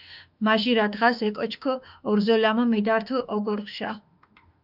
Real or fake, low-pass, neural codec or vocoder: fake; 5.4 kHz; autoencoder, 48 kHz, 32 numbers a frame, DAC-VAE, trained on Japanese speech